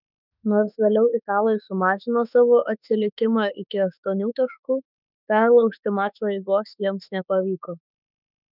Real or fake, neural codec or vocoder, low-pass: fake; autoencoder, 48 kHz, 32 numbers a frame, DAC-VAE, trained on Japanese speech; 5.4 kHz